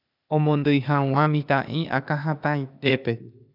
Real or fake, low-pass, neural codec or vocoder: fake; 5.4 kHz; codec, 16 kHz, 0.8 kbps, ZipCodec